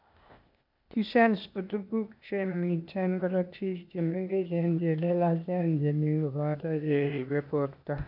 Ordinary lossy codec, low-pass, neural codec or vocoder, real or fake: none; 5.4 kHz; codec, 16 kHz, 0.8 kbps, ZipCodec; fake